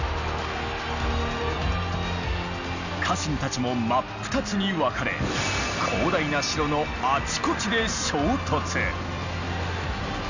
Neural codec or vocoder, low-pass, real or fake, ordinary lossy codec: none; 7.2 kHz; real; none